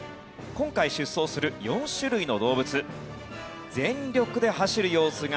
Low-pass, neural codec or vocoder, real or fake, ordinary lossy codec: none; none; real; none